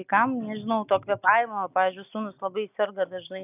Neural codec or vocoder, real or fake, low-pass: none; real; 3.6 kHz